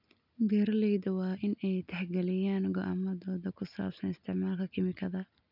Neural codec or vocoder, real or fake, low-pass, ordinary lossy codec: none; real; 5.4 kHz; none